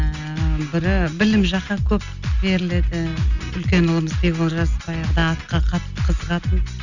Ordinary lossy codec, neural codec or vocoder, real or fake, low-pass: none; none; real; 7.2 kHz